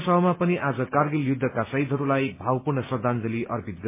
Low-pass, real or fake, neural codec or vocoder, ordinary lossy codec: 3.6 kHz; real; none; MP3, 16 kbps